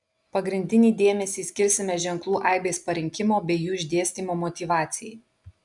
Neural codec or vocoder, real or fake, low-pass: none; real; 10.8 kHz